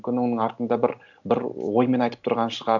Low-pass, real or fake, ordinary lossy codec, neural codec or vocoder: 7.2 kHz; real; none; none